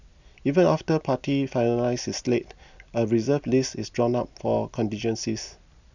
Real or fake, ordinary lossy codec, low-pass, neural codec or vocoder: real; none; 7.2 kHz; none